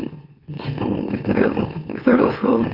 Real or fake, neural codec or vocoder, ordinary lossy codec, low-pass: fake; autoencoder, 44.1 kHz, a latent of 192 numbers a frame, MeloTTS; Opus, 64 kbps; 5.4 kHz